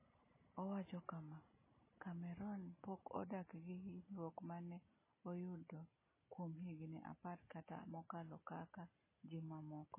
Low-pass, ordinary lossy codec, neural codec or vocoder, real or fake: 3.6 kHz; MP3, 16 kbps; none; real